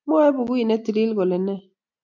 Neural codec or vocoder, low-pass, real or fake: none; 7.2 kHz; real